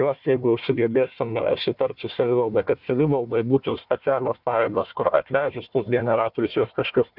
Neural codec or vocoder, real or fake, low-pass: codec, 16 kHz, 1 kbps, FunCodec, trained on Chinese and English, 50 frames a second; fake; 5.4 kHz